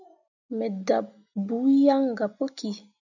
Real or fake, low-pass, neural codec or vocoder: real; 7.2 kHz; none